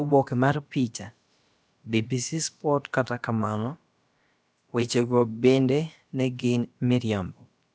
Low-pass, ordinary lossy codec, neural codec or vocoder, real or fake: none; none; codec, 16 kHz, about 1 kbps, DyCAST, with the encoder's durations; fake